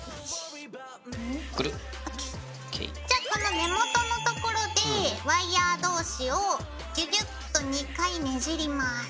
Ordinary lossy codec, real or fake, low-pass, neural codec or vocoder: none; real; none; none